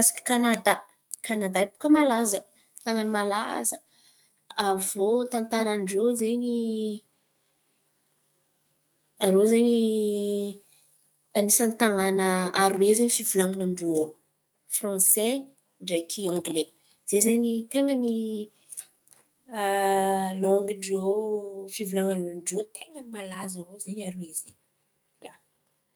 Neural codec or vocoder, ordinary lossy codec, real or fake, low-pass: codec, 44.1 kHz, 2.6 kbps, SNAC; none; fake; none